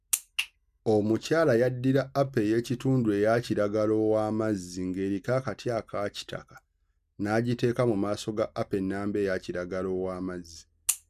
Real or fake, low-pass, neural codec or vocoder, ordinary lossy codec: real; 14.4 kHz; none; none